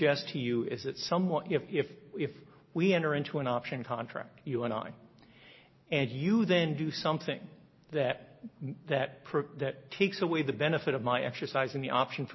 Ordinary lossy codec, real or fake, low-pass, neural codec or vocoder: MP3, 24 kbps; real; 7.2 kHz; none